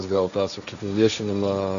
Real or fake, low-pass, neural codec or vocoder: fake; 7.2 kHz; codec, 16 kHz, 1.1 kbps, Voila-Tokenizer